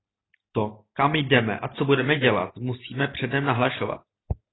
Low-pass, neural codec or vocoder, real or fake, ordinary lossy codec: 7.2 kHz; none; real; AAC, 16 kbps